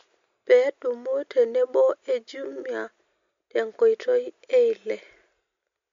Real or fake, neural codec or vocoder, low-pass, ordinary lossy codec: real; none; 7.2 kHz; MP3, 48 kbps